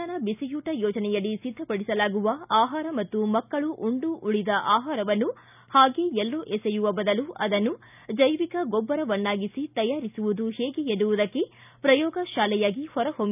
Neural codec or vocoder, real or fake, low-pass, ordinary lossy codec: none; real; 3.6 kHz; none